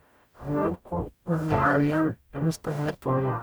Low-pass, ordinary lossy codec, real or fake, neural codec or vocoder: none; none; fake; codec, 44.1 kHz, 0.9 kbps, DAC